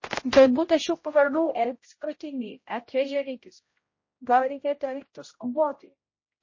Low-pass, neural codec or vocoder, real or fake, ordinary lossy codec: 7.2 kHz; codec, 16 kHz, 0.5 kbps, X-Codec, HuBERT features, trained on general audio; fake; MP3, 32 kbps